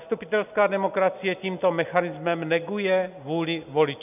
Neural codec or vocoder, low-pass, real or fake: none; 3.6 kHz; real